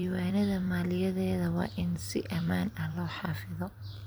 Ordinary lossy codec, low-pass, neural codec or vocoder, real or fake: none; none; vocoder, 44.1 kHz, 128 mel bands every 256 samples, BigVGAN v2; fake